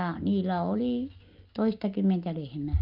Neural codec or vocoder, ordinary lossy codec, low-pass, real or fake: none; Opus, 32 kbps; 5.4 kHz; real